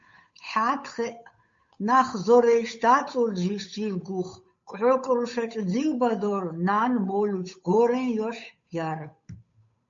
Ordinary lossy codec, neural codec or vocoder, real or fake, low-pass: MP3, 48 kbps; codec, 16 kHz, 8 kbps, FunCodec, trained on Chinese and English, 25 frames a second; fake; 7.2 kHz